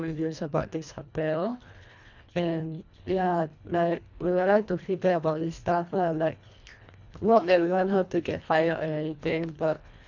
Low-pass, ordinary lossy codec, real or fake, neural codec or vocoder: 7.2 kHz; none; fake; codec, 24 kHz, 1.5 kbps, HILCodec